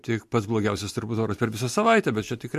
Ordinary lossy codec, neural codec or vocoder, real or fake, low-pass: MP3, 64 kbps; none; real; 14.4 kHz